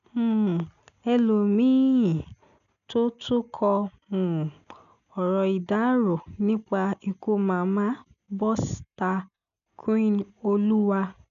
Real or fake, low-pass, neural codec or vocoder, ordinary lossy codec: real; 7.2 kHz; none; none